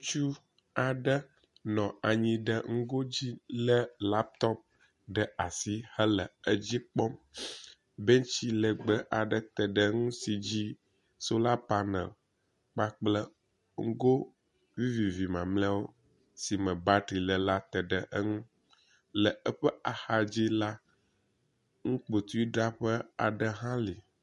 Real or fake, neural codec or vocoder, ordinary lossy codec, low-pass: real; none; MP3, 48 kbps; 14.4 kHz